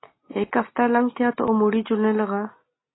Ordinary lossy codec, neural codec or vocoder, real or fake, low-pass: AAC, 16 kbps; none; real; 7.2 kHz